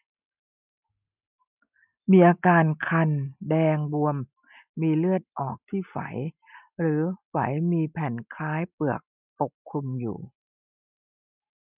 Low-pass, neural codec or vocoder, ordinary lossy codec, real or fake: 3.6 kHz; none; none; real